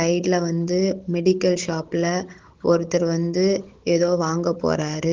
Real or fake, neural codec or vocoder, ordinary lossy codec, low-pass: fake; codec, 16 kHz, 16 kbps, FunCodec, trained on Chinese and English, 50 frames a second; Opus, 16 kbps; 7.2 kHz